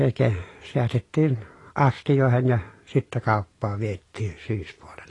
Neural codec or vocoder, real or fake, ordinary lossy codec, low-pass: none; real; AAC, 32 kbps; 10.8 kHz